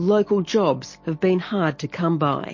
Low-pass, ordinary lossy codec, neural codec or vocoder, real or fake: 7.2 kHz; MP3, 32 kbps; none; real